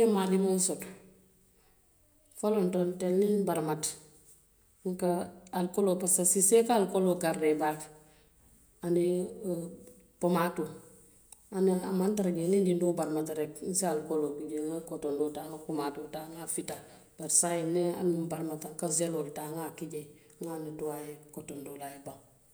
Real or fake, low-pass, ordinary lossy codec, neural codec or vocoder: fake; none; none; vocoder, 48 kHz, 128 mel bands, Vocos